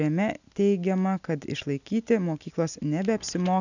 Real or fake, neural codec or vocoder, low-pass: real; none; 7.2 kHz